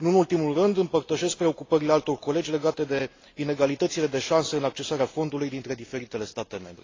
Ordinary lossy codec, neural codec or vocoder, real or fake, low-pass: AAC, 32 kbps; none; real; 7.2 kHz